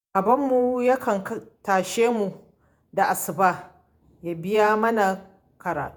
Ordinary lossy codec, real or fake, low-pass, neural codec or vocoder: none; fake; none; vocoder, 48 kHz, 128 mel bands, Vocos